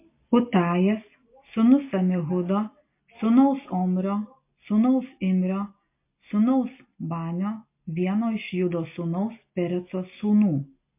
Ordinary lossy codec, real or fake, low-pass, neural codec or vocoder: MP3, 24 kbps; real; 3.6 kHz; none